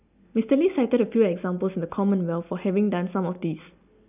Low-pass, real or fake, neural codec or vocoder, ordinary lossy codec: 3.6 kHz; real; none; none